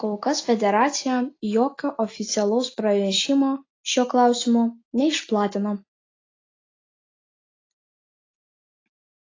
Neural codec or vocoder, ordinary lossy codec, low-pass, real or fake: none; AAC, 32 kbps; 7.2 kHz; real